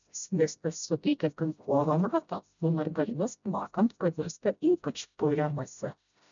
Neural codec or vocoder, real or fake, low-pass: codec, 16 kHz, 0.5 kbps, FreqCodec, smaller model; fake; 7.2 kHz